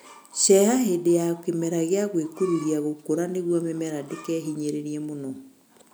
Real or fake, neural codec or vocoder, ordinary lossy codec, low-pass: real; none; none; none